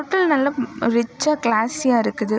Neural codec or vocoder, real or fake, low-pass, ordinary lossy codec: none; real; none; none